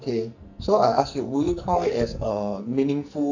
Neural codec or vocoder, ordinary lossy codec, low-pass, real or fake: codec, 44.1 kHz, 2.6 kbps, SNAC; none; 7.2 kHz; fake